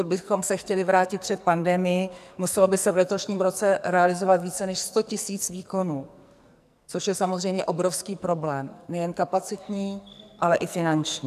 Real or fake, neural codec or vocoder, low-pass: fake; codec, 44.1 kHz, 2.6 kbps, SNAC; 14.4 kHz